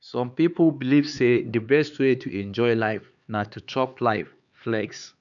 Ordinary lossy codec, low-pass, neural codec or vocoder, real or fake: none; 7.2 kHz; codec, 16 kHz, 4 kbps, X-Codec, HuBERT features, trained on LibriSpeech; fake